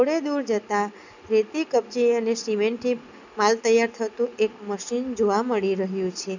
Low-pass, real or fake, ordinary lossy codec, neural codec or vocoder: 7.2 kHz; real; none; none